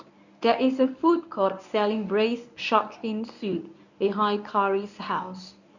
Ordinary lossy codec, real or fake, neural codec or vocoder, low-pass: none; fake; codec, 24 kHz, 0.9 kbps, WavTokenizer, medium speech release version 1; 7.2 kHz